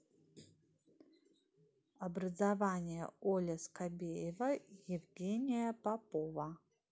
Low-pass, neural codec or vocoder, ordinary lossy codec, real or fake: none; none; none; real